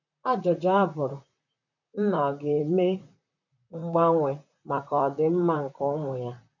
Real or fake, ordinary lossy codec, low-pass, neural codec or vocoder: fake; none; 7.2 kHz; vocoder, 44.1 kHz, 128 mel bands, Pupu-Vocoder